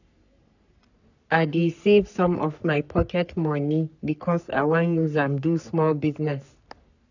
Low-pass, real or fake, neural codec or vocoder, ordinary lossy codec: 7.2 kHz; fake; codec, 44.1 kHz, 3.4 kbps, Pupu-Codec; none